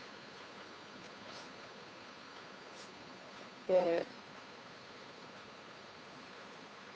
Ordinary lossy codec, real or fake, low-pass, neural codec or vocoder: none; fake; none; codec, 16 kHz, 2 kbps, FunCodec, trained on Chinese and English, 25 frames a second